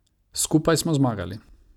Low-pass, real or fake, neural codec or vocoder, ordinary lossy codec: 19.8 kHz; real; none; none